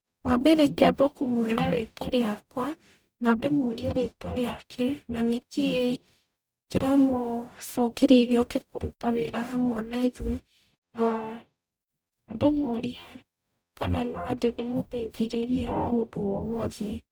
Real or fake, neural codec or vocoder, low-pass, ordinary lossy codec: fake; codec, 44.1 kHz, 0.9 kbps, DAC; none; none